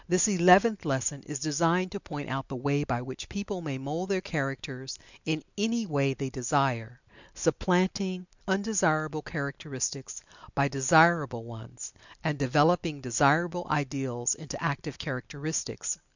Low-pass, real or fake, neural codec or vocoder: 7.2 kHz; real; none